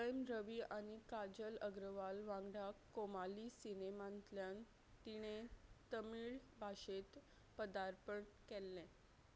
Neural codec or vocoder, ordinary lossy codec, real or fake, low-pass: none; none; real; none